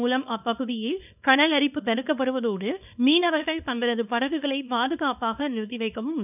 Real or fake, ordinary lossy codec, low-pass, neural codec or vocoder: fake; none; 3.6 kHz; codec, 24 kHz, 0.9 kbps, WavTokenizer, small release